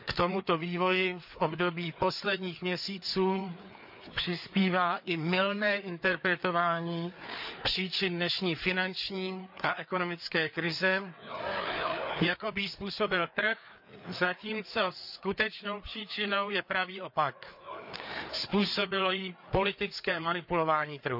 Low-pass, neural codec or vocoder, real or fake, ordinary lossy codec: 5.4 kHz; codec, 16 kHz, 4 kbps, FreqCodec, larger model; fake; none